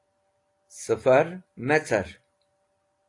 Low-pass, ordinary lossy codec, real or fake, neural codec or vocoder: 10.8 kHz; AAC, 48 kbps; real; none